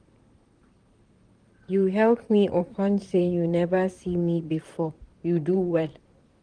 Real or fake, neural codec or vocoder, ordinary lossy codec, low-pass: fake; vocoder, 44.1 kHz, 128 mel bands, Pupu-Vocoder; Opus, 24 kbps; 9.9 kHz